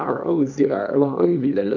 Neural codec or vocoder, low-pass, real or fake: codec, 24 kHz, 0.9 kbps, WavTokenizer, small release; 7.2 kHz; fake